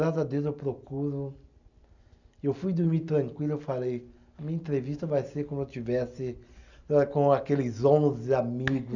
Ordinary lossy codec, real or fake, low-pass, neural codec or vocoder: none; real; 7.2 kHz; none